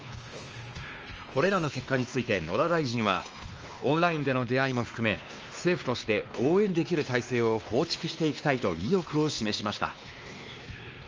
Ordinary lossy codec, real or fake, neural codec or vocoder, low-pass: Opus, 24 kbps; fake; codec, 16 kHz, 2 kbps, X-Codec, WavLM features, trained on Multilingual LibriSpeech; 7.2 kHz